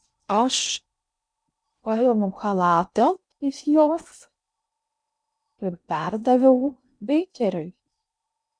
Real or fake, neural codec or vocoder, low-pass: fake; codec, 16 kHz in and 24 kHz out, 0.6 kbps, FocalCodec, streaming, 2048 codes; 9.9 kHz